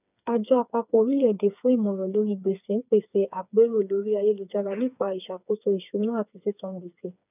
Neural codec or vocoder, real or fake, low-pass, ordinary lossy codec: codec, 16 kHz, 4 kbps, FreqCodec, smaller model; fake; 3.6 kHz; none